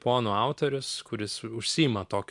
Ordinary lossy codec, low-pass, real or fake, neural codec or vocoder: MP3, 96 kbps; 10.8 kHz; real; none